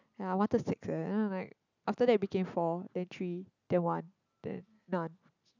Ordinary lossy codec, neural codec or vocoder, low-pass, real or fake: none; none; 7.2 kHz; real